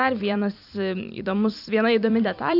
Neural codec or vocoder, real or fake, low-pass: vocoder, 44.1 kHz, 128 mel bands every 256 samples, BigVGAN v2; fake; 5.4 kHz